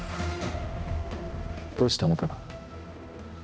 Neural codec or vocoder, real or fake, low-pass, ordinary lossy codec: codec, 16 kHz, 1 kbps, X-Codec, HuBERT features, trained on balanced general audio; fake; none; none